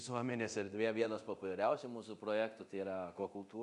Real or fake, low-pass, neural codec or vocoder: fake; 10.8 kHz; codec, 24 kHz, 0.9 kbps, DualCodec